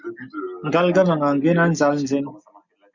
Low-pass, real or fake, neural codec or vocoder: 7.2 kHz; real; none